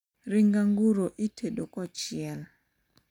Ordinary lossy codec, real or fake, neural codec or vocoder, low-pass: none; real; none; 19.8 kHz